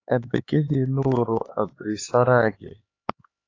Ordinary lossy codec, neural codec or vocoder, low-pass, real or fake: AAC, 32 kbps; codec, 16 kHz, 4 kbps, X-Codec, HuBERT features, trained on LibriSpeech; 7.2 kHz; fake